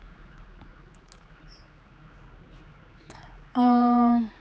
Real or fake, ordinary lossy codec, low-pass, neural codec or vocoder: fake; none; none; codec, 16 kHz, 4 kbps, X-Codec, HuBERT features, trained on general audio